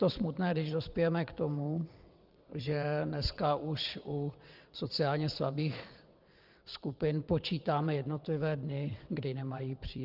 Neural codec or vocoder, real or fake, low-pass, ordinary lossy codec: vocoder, 22.05 kHz, 80 mel bands, WaveNeXt; fake; 5.4 kHz; Opus, 32 kbps